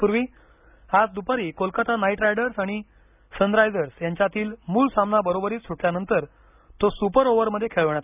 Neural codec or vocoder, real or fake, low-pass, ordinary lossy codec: none; real; 3.6 kHz; none